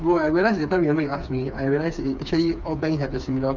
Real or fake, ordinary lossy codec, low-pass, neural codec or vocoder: fake; none; 7.2 kHz; codec, 16 kHz, 4 kbps, FreqCodec, smaller model